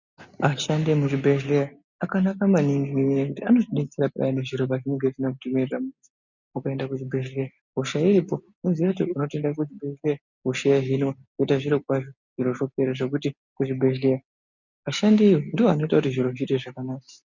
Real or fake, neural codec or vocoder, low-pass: real; none; 7.2 kHz